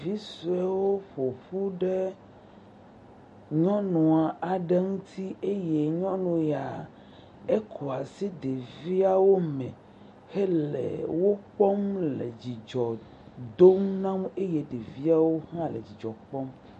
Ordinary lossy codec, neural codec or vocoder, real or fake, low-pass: MP3, 48 kbps; none; real; 14.4 kHz